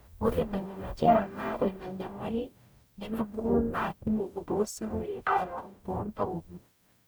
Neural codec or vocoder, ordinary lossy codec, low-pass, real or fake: codec, 44.1 kHz, 0.9 kbps, DAC; none; none; fake